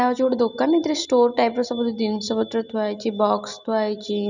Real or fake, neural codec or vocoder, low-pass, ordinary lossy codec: real; none; 7.2 kHz; none